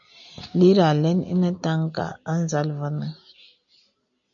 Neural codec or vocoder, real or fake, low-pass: none; real; 7.2 kHz